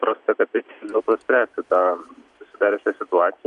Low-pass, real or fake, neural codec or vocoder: 10.8 kHz; real; none